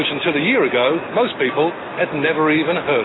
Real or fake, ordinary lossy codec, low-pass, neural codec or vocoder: real; AAC, 16 kbps; 7.2 kHz; none